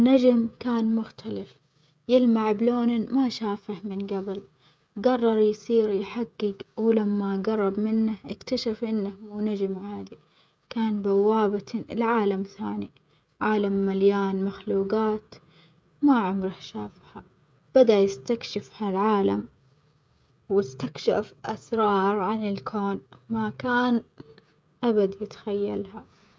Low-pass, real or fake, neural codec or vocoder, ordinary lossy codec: none; fake; codec, 16 kHz, 16 kbps, FreqCodec, smaller model; none